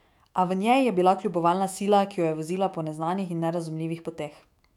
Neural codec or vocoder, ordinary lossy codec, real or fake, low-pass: autoencoder, 48 kHz, 128 numbers a frame, DAC-VAE, trained on Japanese speech; none; fake; 19.8 kHz